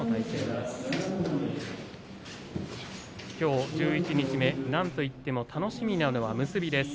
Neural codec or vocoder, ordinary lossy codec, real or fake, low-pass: none; none; real; none